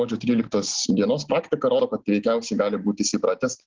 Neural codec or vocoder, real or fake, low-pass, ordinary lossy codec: none; real; 7.2 kHz; Opus, 16 kbps